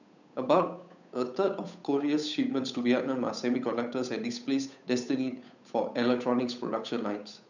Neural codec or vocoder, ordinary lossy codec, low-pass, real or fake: codec, 16 kHz, 8 kbps, FunCodec, trained on Chinese and English, 25 frames a second; none; 7.2 kHz; fake